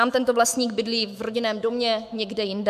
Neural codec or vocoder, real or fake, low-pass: autoencoder, 48 kHz, 128 numbers a frame, DAC-VAE, trained on Japanese speech; fake; 14.4 kHz